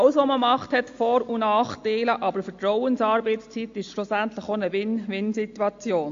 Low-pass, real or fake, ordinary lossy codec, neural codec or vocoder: 7.2 kHz; real; AAC, 48 kbps; none